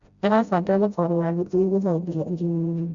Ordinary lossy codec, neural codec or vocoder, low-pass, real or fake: Opus, 64 kbps; codec, 16 kHz, 0.5 kbps, FreqCodec, smaller model; 7.2 kHz; fake